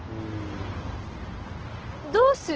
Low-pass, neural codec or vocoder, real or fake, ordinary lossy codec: 7.2 kHz; none; real; Opus, 16 kbps